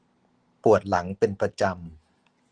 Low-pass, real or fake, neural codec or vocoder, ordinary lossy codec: 9.9 kHz; real; none; Opus, 16 kbps